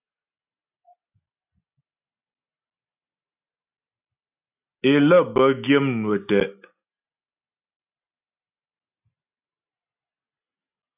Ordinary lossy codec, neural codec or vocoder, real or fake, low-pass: AAC, 24 kbps; none; real; 3.6 kHz